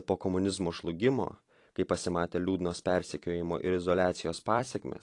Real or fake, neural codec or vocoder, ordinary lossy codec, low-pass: fake; vocoder, 44.1 kHz, 128 mel bands every 256 samples, BigVGAN v2; AAC, 48 kbps; 10.8 kHz